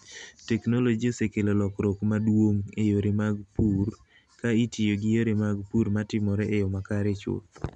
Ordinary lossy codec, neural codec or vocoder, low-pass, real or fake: none; none; 10.8 kHz; real